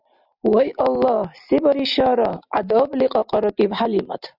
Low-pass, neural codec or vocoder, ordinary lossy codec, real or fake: 5.4 kHz; none; Opus, 64 kbps; real